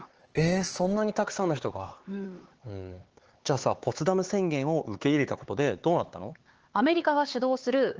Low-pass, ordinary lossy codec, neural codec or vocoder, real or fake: 7.2 kHz; Opus, 16 kbps; codec, 16 kHz, 4 kbps, X-Codec, HuBERT features, trained on LibriSpeech; fake